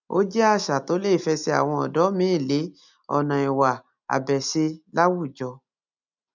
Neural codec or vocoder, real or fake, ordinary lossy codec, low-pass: none; real; none; 7.2 kHz